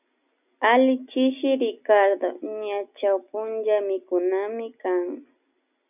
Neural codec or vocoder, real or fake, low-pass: none; real; 3.6 kHz